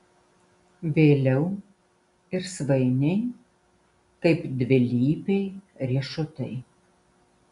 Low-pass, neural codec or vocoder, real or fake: 10.8 kHz; none; real